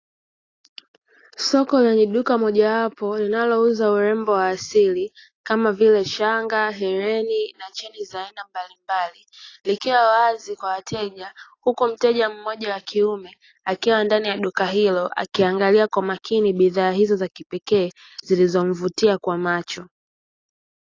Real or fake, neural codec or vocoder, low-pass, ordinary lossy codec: real; none; 7.2 kHz; AAC, 32 kbps